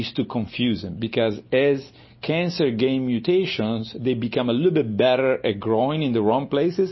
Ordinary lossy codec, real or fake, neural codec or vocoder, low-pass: MP3, 24 kbps; real; none; 7.2 kHz